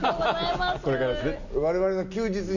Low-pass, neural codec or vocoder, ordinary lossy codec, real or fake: 7.2 kHz; none; none; real